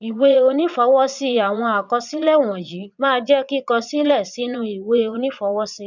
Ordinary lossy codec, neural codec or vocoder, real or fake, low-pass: none; vocoder, 22.05 kHz, 80 mel bands, Vocos; fake; 7.2 kHz